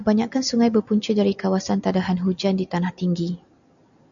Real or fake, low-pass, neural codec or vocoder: real; 7.2 kHz; none